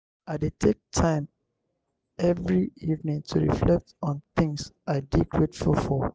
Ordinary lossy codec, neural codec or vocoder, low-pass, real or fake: none; none; none; real